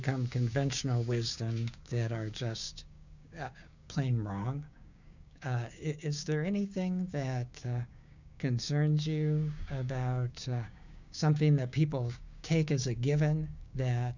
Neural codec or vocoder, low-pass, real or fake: codec, 16 kHz, 6 kbps, DAC; 7.2 kHz; fake